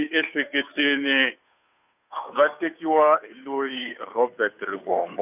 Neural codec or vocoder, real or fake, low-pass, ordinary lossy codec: codec, 16 kHz, 2 kbps, FunCodec, trained on Chinese and English, 25 frames a second; fake; 3.6 kHz; none